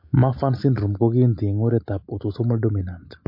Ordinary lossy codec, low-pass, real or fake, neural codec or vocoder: MP3, 32 kbps; 5.4 kHz; real; none